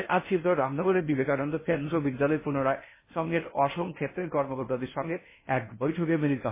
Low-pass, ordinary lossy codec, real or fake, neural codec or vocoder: 3.6 kHz; MP3, 16 kbps; fake; codec, 16 kHz in and 24 kHz out, 0.6 kbps, FocalCodec, streaming, 4096 codes